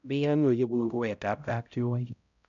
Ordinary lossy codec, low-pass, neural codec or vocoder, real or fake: MP3, 96 kbps; 7.2 kHz; codec, 16 kHz, 0.5 kbps, X-Codec, HuBERT features, trained on balanced general audio; fake